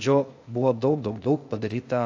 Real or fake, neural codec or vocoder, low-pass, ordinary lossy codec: fake; codec, 16 kHz, 0.8 kbps, ZipCodec; 7.2 kHz; AAC, 48 kbps